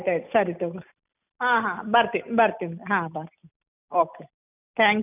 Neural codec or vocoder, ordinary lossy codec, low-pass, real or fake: none; none; 3.6 kHz; real